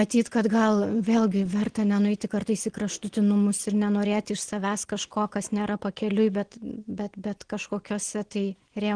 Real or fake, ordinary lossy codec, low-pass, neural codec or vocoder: real; Opus, 16 kbps; 9.9 kHz; none